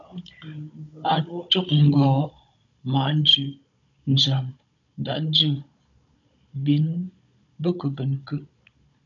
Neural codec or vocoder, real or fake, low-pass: codec, 16 kHz, 16 kbps, FunCodec, trained on Chinese and English, 50 frames a second; fake; 7.2 kHz